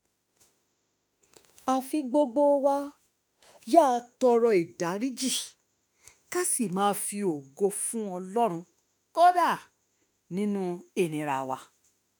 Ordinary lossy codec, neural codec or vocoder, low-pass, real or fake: none; autoencoder, 48 kHz, 32 numbers a frame, DAC-VAE, trained on Japanese speech; none; fake